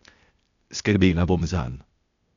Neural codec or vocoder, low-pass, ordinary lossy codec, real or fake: codec, 16 kHz, 0.8 kbps, ZipCodec; 7.2 kHz; none; fake